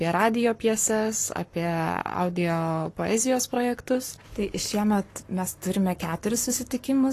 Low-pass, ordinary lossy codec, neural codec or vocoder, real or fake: 14.4 kHz; AAC, 48 kbps; codec, 44.1 kHz, 7.8 kbps, Pupu-Codec; fake